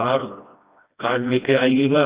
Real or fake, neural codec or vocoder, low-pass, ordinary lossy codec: fake; codec, 16 kHz, 1 kbps, FreqCodec, smaller model; 3.6 kHz; Opus, 64 kbps